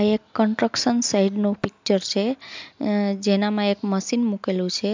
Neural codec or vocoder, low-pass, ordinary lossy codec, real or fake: none; 7.2 kHz; MP3, 64 kbps; real